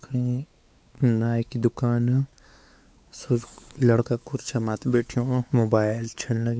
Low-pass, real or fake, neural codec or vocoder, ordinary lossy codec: none; fake; codec, 16 kHz, 4 kbps, X-Codec, WavLM features, trained on Multilingual LibriSpeech; none